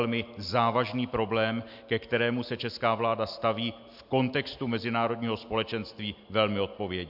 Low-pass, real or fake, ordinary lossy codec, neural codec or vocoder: 5.4 kHz; real; MP3, 48 kbps; none